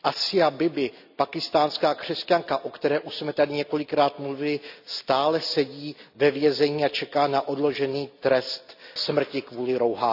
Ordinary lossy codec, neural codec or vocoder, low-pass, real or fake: none; none; 5.4 kHz; real